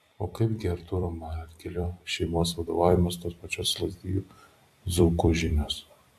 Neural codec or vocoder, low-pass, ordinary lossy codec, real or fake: vocoder, 44.1 kHz, 128 mel bands every 256 samples, BigVGAN v2; 14.4 kHz; AAC, 96 kbps; fake